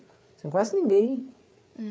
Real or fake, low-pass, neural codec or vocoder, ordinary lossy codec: fake; none; codec, 16 kHz, 8 kbps, FreqCodec, larger model; none